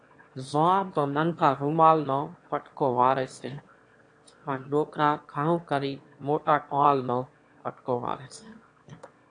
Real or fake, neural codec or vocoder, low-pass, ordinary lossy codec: fake; autoencoder, 22.05 kHz, a latent of 192 numbers a frame, VITS, trained on one speaker; 9.9 kHz; AAC, 48 kbps